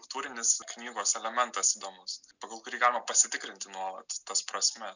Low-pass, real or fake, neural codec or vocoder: 7.2 kHz; real; none